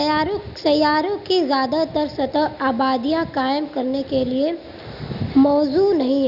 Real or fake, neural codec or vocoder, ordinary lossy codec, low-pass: real; none; none; 5.4 kHz